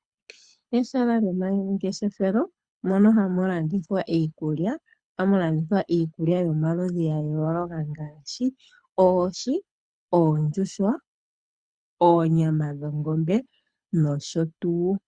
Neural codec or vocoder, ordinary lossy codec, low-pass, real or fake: codec, 24 kHz, 6 kbps, HILCodec; Opus, 64 kbps; 9.9 kHz; fake